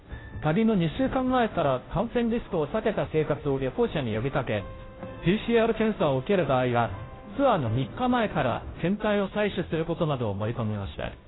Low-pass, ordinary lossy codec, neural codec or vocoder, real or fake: 7.2 kHz; AAC, 16 kbps; codec, 16 kHz, 0.5 kbps, FunCodec, trained on Chinese and English, 25 frames a second; fake